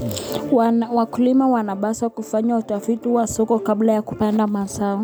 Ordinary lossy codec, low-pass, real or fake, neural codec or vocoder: none; none; real; none